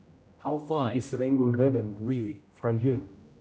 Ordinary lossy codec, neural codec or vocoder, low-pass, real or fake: none; codec, 16 kHz, 0.5 kbps, X-Codec, HuBERT features, trained on general audio; none; fake